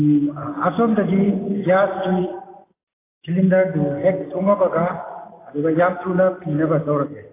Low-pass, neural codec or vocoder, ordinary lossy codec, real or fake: 3.6 kHz; none; AAC, 16 kbps; real